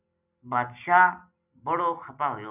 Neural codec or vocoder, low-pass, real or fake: none; 3.6 kHz; real